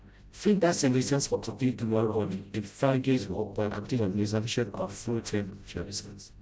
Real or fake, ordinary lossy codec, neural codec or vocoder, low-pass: fake; none; codec, 16 kHz, 0.5 kbps, FreqCodec, smaller model; none